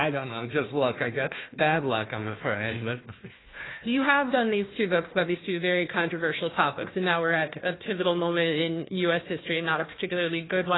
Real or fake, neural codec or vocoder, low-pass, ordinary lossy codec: fake; codec, 16 kHz, 1 kbps, FunCodec, trained on Chinese and English, 50 frames a second; 7.2 kHz; AAC, 16 kbps